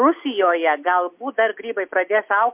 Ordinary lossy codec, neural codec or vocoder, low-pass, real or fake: MP3, 32 kbps; none; 3.6 kHz; real